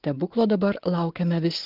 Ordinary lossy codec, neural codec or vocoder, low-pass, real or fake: Opus, 16 kbps; none; 5.4 kHz; real